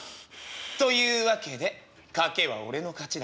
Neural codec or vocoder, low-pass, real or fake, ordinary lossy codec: none; none; real; none